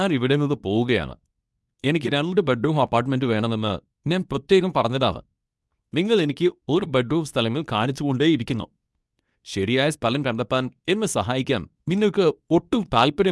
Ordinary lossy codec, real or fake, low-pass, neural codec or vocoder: none; fake; none; codec, 24 kHz, 0.9 kbps, WavTokenizer, medium speech release version 2